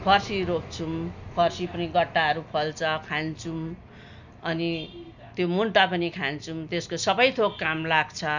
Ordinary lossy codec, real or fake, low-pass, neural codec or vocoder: none; real; 7.2 kHz; none